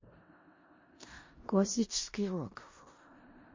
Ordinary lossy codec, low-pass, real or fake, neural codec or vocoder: MP3, 32 kbps; 7.2 kHz; fake; codec, 16 kHz in and 24 kHz out, 0.4 kbps, LongCat-Audio-Codec, four codebook decoder